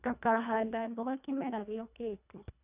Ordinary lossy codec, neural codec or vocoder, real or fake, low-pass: none; codec, 24 kHz, 1.5 kbps, HILCodec; fake; 3.6 kHz